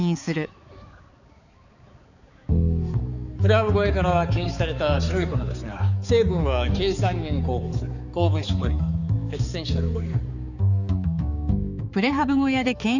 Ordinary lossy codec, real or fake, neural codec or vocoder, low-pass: none; fake; codec, 16 kHz, 4 kbps, X-Codec, HuBERT features, trained on balanced general audio; 7.2 kHz